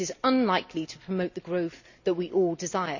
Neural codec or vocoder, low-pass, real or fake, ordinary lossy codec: none; 7.2 kHz; real; none